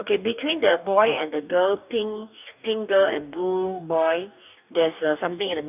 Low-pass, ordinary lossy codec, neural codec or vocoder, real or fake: 3.6 kHz; none; codec, 44.1 kHz, 2.6 kbps, DAC; fake